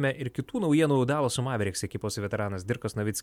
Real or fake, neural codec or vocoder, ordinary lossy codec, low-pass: real; none; MP3, 96 kbps; 19.8 kHz